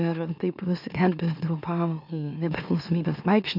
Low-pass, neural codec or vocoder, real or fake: 5.4 kHz; autoencoder, 44.1 kHz, a latent of 192 numbers a frame, MeloTTS; fake